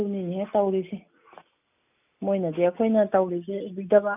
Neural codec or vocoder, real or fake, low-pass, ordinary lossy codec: none; real; 3.6 kHz; none